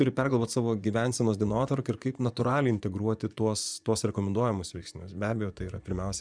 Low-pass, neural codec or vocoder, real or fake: 9.9 kHz; vocoder, 24 kHz, 100 mel bands, Vocos; fake